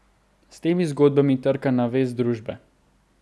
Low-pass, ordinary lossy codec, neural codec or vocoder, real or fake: none; none; none; real